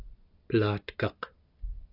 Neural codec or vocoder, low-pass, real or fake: none; 5.4 kHz; real